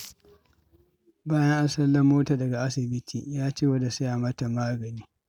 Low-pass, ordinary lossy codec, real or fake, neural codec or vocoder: 19.8 kHz; none; real; none